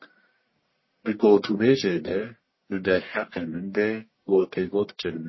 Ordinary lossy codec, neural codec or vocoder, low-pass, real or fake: MP3, 24 kbps; codec, 44.1 kHz, 1.7 kbps, Pupu-Codec; 7.2 kHz; fake